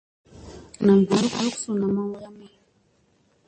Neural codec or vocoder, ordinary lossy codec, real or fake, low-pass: none; MP3, 32 kbps; real; 9.9 kHz